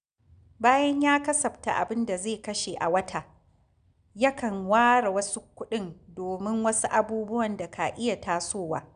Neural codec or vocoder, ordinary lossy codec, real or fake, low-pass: none; none; real; 9.9 kHz